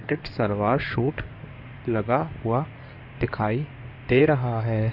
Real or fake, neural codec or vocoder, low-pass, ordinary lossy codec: fake; codec, 16 kHz in and 24 kHz out, 2.2 kbps, FireRedTTS-2 codec; 5.4 kHz; none